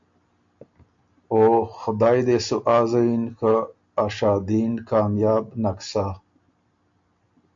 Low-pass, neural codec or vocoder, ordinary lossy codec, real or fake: 7.2 kHz; none; MP3, 64 kbps; real